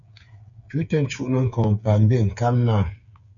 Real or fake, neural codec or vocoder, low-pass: fake; codec, 16 kHz, 8 kbps, FreqCodec, smaller model; 7.2 kHz